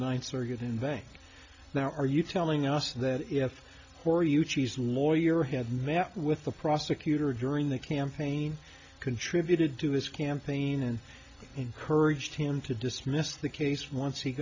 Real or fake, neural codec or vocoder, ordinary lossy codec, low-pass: real; none; AAC, 48 kbps; 7.2 kHz